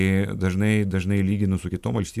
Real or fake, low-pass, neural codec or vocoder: real; 14.4 kHz; none